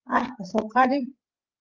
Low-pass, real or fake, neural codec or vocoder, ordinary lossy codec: 7.2 kHz; fake; codec, 16 kHz, 8 kbps, FreqCodec, larger model; Opus, 24 kbps